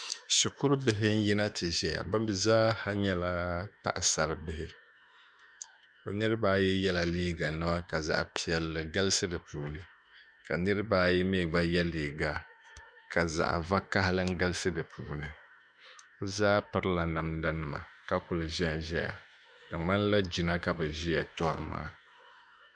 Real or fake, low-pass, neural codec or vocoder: fake; 9.9 kHz; autoencoder, 48 kHz, 32 numbers a frame, DAC-VAE, trained on Japanese speech